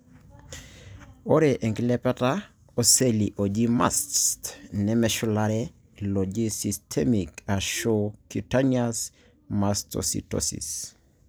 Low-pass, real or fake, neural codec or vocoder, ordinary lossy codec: none; real; none; none